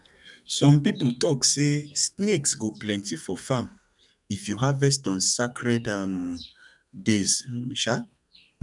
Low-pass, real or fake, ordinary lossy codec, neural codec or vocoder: 10.8 kHz; fake; none; codec, 32 kHz, 1.9 kbps, SNAC